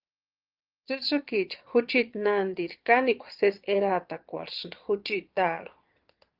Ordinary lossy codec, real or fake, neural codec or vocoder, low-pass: Opus, 32 kbps; fake; vocoder, 22.05 kHz, 80 mel bands, Vocos; 5.4 kHz